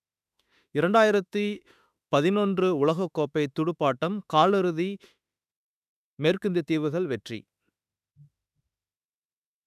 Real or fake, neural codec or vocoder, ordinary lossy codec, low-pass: fake; autoencoder, 48 kHz, 32 numbers a frame, DAC-VAE, trained on Japanese speech; none; 14.4 kHz